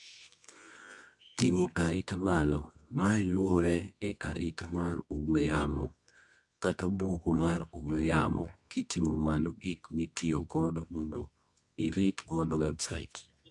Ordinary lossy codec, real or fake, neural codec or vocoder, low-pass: MP3, 64 kbps; fake; codec, 24 kHz, 0.9 kbps, WavTokenizer, medium music audio release; 10.8 kHz